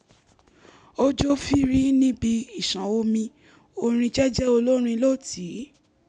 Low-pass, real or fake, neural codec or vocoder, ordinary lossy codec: 10.8 kHz; real; none; none